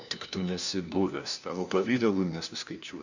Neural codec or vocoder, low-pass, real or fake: codec, 16 kHz, 1 kbps, FunCodec, trained on LibriTTS, 50 frames a second; 7.2 kHz; fake